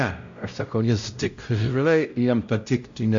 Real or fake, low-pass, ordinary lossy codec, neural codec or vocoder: fake; 7.2 kHz; MP3, 64 kbps; codec, 16 kHz, 0.5 kbps, X-Codec, WavLM features, trained on Multilingual LibriSpeech